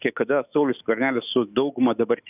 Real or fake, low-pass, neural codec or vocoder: fake; 3.6 kHz; autoencoder, 48 kHz, 128 numbers a frame, DAC-VAE, trained on Japanese speech